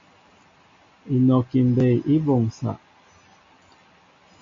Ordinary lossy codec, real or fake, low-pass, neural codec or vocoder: MP3, 48 kbps; real; 7.2 kHz; none